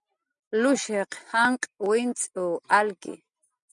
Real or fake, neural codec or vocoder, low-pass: real; none; 10.8 kHz